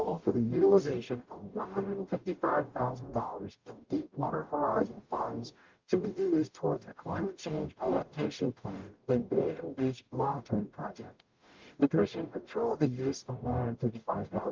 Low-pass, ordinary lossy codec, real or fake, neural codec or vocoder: 7.2 kHz; Opus, 24 kbps; fake; codec, 44.1 kHz, 0.9 kbps, DAC